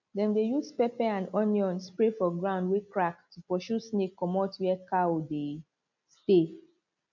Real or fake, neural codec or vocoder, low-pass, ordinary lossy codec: real; none; 7.2 kHz; none